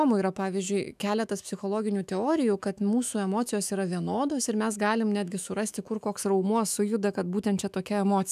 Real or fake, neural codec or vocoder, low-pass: fake; autoencoder, 48 kHz, 128 numbers a frame, DAC-VAE, trained on Japanese speech; 14.4 kHz